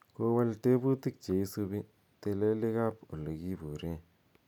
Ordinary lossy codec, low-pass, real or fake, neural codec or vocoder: none; 19.8 kHz; real; none